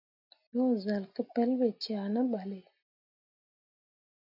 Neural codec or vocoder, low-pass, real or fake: none; 5.4 kHz; real